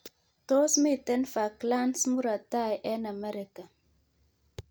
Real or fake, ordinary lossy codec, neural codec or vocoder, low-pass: real; none; none; none